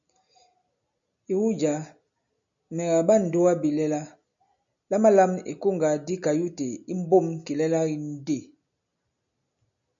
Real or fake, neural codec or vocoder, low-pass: real; none; 7.2 kHz